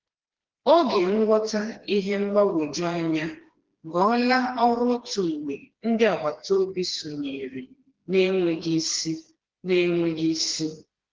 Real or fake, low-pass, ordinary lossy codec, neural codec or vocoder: fake; 7.2 kHz; Opus, 16 kbps; codec, 16 kHz, 2 kbps, FreqCodec, smaller model